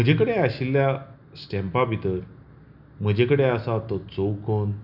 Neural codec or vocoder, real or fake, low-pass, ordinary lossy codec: none; real; 5.4 kHz; none